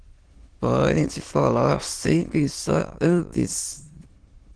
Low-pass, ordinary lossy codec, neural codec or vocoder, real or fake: 9.9 kHz; Opus, 16 kbps; autoencoder, 22.05 kHz, a latent of 192 numbers a frame, VITS, trained on many speakers; fake